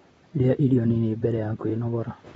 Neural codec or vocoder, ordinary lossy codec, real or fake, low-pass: vocoder, 44.1 kHz, 128 mel bands, Pupu-Vocoder; AAC, 24 kbps; fake; 19.8 kHz